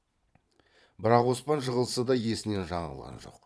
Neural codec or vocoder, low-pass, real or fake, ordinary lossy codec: vocoder, 22.05 kHz, 80 mel bands, Vocos; none; fake; none